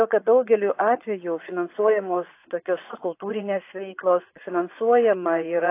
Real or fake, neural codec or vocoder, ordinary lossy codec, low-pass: fake; vocoder, 24 kHz, 100 mel bands, Vocos; AAC, 24 kbps; 3.6 kHz